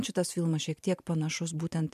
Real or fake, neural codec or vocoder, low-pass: fake; vocoder, 44.1 kHz, 128 mel bands every 512 samples, BigVGAN v2; 14.4 kHz